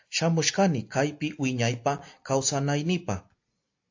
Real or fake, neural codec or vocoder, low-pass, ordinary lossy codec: real; none; 7.2 kHz; AAC, 48 kbps